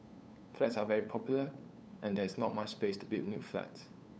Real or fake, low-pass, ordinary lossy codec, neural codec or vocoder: fake; none; none; codec, 16 kHz, 8 kbps, FunCodec, trained on LibriTTS, 25 frames a second